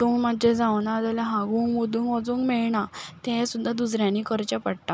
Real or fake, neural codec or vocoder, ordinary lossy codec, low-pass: real; none; none; none